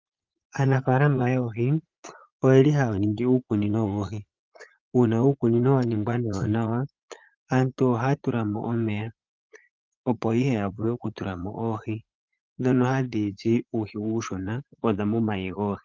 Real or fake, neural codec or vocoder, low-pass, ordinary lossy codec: fake; vocoder, 44.1 kHz, 80 mel bands, Vocos; 7.2 kHz; Opus, 32 kbps